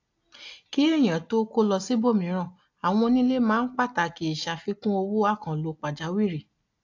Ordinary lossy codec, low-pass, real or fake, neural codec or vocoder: AAC, 48 kbps; 7.2 kHz; real; none